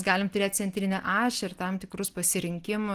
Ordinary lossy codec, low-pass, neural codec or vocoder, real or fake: Opus, 24 kbps; 14.4 kHz; none; real